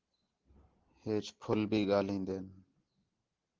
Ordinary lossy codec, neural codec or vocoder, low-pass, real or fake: Opus, 16 kbps; none; 7.2 kHz; real